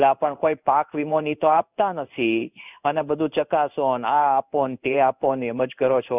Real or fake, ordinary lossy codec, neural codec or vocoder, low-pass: fake; none; codec, 16 kHz in and 24 kHz out, 1 kbps, XY-Tokenizer; 3.6 kHz